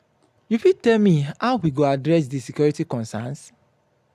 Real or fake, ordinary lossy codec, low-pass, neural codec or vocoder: real; none; 14.4 kHz; none